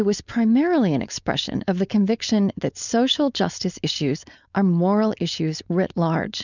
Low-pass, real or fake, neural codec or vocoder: 7.2 kHz; fake; codec, 16 kHz, 4.8 kbps, FACodec